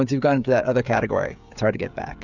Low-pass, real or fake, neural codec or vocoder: 7.2 kHz; fake; codec, 16 kHz, 16 kbps, FreqCodec, smaller model